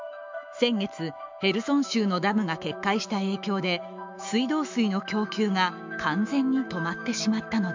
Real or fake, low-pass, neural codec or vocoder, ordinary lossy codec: fake; 7.2 kHz; autoencoder, 48 kHz, 128 numbers a frame, DAC-VAE, trained on Japanese speech; MP3, 64 kbps